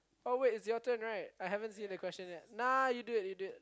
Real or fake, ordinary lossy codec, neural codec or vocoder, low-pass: real; none; none; none